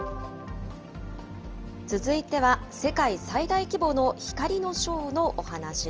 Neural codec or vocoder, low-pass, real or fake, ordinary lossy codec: none; 7.2 kHz; real; Opus, 24 kbps